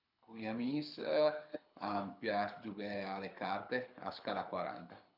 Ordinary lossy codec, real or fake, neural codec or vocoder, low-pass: none; fake; codec, 24 kHz, 6 kbps, HILCodec; 5.4 kHz